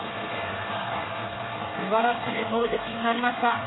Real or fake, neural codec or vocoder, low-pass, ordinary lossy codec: fake; codec, 24 kHz, 1 kbps, SNAC; 7.2 kHz; AAC, 16 kbps